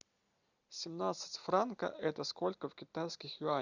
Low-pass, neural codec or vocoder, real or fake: 7.2 kHz; none; real